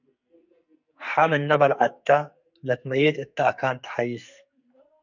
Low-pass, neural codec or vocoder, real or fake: 7.2 kHz; codec, 44.1 kHz, 2.6 kbps, SNAC; fake